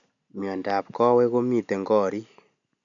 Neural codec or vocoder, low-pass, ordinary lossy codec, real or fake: none; 7.2 kHz; none; real